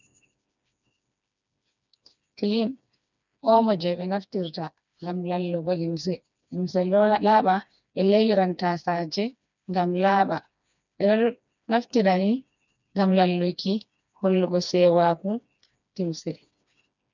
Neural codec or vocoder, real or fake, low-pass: codec, 16 kHz, 2 kbps, FreqCodec, smaller model; fake; 7.2 kHz